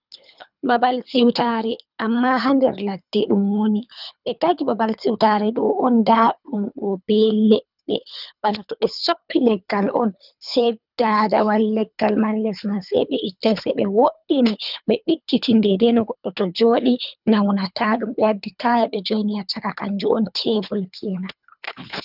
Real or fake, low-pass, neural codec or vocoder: fake; 5.4 kHz; codec, 24 kHz, 3 kbps, HILCodec